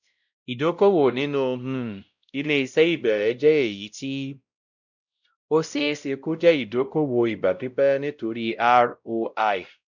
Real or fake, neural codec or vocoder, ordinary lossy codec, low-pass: fake; codec, 16 kHz, 0.5 kbps, X-Codec, WavLM features, trained on Multilingual LibriSpeech; none; 7.2 kHz